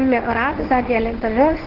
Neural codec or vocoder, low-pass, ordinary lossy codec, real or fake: codec, 24 kHz, 0.9 kbps, WavTokenizer, medium speech release version 1; 5.4 kHz; Opus, 16 kbps; fake